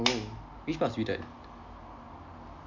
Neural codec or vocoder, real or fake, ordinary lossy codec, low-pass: none; real; MP3, 64 kbps; 7.2 kHz